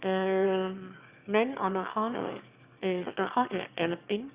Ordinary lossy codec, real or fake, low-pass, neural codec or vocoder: Opus, 64 kbps; fake; 3.6 kHz; autoencoder, 22.05 kHz, a latent of 192 numbers a frame, VITS, trained on one speaker